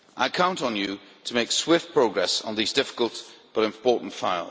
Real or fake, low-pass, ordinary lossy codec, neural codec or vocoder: real; none; none; none